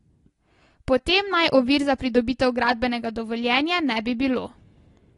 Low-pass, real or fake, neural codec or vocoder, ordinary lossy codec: 10.8 kHz; real; none; AAC, 32 kbps